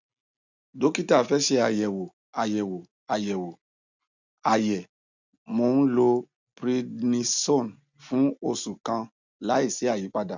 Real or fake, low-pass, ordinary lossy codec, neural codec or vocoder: real; 7.2 kHz; none; none